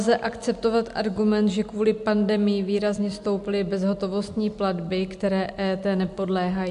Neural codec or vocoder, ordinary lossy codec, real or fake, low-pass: none; MP3, 64 kbps; real; 10.8 kHz